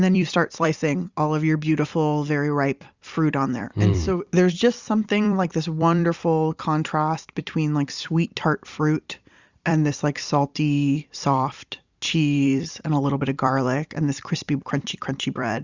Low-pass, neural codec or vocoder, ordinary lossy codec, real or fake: 7.2 kHz; vocoder, 44.1 kHz, 128 mel bands every 256 samples, BigVGAN v2; Opus, 64 kbps; fake